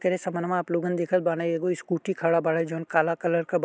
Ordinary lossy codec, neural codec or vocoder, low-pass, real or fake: none; none; none; real